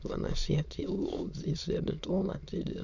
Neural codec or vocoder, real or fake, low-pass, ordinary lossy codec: autoencoder, 22.05 kHz, a latent of 192 numbers a frame, VITS, trained on many speakers; fake; 7.2 kHz; none